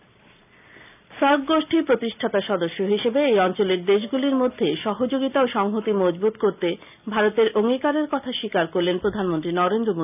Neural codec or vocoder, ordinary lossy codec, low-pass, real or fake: none; none; 3.6 kHz; real